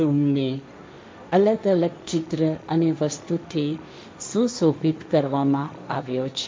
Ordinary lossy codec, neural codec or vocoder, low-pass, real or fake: none; codec, 16 kHz, 1.1 kbps, Voila-Tokenizer; none; fake